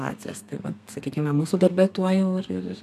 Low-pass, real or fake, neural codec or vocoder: 14.4 kHz; fake; codec, 32 kHz, 1.9 kbps, SNAC